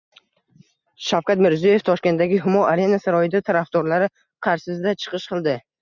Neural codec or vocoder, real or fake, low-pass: none; real; 7.2 kHz